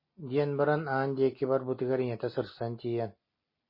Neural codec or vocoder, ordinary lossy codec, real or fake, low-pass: none; MP3, 24 kbps; real; 5.4 kHz